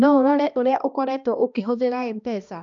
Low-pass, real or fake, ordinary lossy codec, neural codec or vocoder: 7.2 kHz; fake; none; codec, 16 kHz, 1 kbps, X-Codec, HuBERT features, trained on balanced general audio